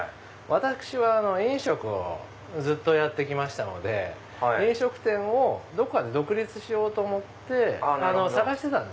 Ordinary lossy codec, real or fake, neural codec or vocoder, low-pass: none; real; none; none